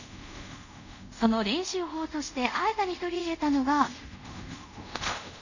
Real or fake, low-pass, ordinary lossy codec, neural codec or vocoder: fake; 7.2 kHz; none; codec, 24 kHz, 0.5 kbps, DualCodec